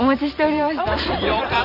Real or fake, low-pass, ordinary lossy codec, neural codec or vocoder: fake; 5.4 kHz; none; vocoder, 44.1 kHz, 128 mel bands every 256 samples, BigVGAN v2